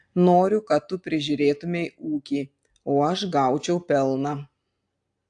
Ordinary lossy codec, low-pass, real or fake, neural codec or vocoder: AAC, 64 kbps; 9.9 kHz; real; none